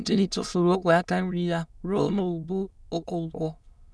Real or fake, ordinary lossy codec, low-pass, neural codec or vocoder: fake; none; none; autoencoder, 22.05 kHz, a latent of 192 numbers a frame, VITS, trained on many speakers